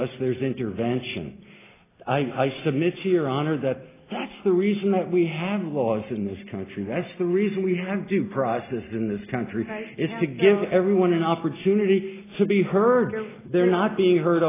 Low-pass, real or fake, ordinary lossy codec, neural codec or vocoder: 3.6 kHz; real; AAC, 16 kbps; none